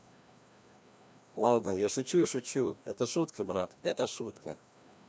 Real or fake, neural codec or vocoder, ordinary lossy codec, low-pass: fake; codec, 16 kHz, 1 kbps, FreqCodec, larger model; none; none